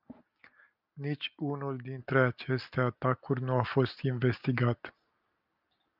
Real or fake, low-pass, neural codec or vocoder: real; 5.4 kHz; none